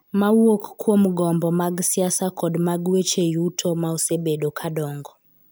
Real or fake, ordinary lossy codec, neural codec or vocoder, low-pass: real; none; none; none